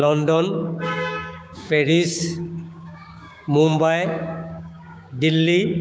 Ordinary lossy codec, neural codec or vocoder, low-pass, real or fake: none; codec, 16 kHz, 6 kbps, DAC; none; fake